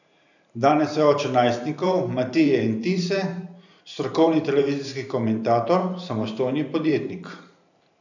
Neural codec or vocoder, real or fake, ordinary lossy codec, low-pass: vocoder, 44.1 kHz, 128 mel bands every 512 samples, BigVGAN v2; fake; none; 7.2 kHz